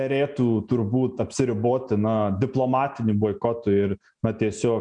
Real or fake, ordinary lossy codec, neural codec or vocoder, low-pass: real; MP3, 96 kbps; none; 9.9 kHz